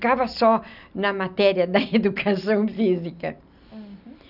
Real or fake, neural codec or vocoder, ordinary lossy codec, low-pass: real; none; none; 5.4 kHz